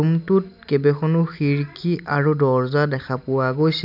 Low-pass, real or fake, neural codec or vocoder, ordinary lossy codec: 5.4 kHz; real; none; none